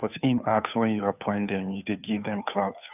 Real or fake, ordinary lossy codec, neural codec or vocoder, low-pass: fake; none; codec, 16 kHz, 2 kbps, FunCodec, trained on Chinese and English, 25 frames a second; 3.6 kHz